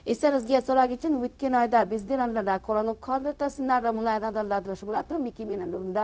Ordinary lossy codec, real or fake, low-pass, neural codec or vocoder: none; fake; none; codec, 16 kHz, 0.4 kbps, LongCat-Audio-Codec